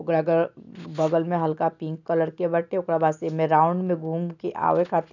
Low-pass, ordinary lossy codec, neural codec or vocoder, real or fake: 7.2 kHz; none; none; real